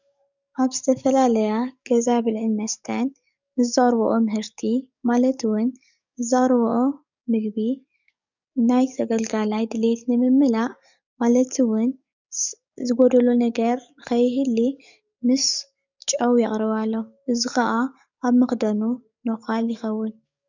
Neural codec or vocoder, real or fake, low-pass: codec, 44.1 kHz, 7.8 kbps, DAC; fake; 7.2 kHz